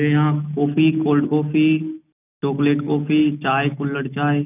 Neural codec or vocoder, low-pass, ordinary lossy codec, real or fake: none; 3.6 kHz; none; real